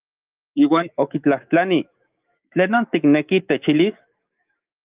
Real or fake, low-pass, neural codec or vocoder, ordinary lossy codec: fake; 3.6 kHz; autoencoder, 48 kHz, 128 numbers a frame, DAC-VAE, trained on Japanese speech; Opus, 32 kbps